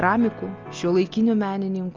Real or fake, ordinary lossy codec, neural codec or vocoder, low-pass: real; Opus, 24 kbps; none; 7.2 kHz